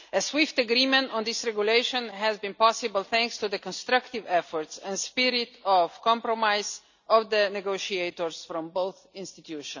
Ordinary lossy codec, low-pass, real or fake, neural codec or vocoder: none; 7.2 kHz; real; none